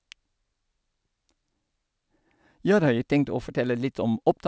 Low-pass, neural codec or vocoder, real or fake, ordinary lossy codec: none; none; real; none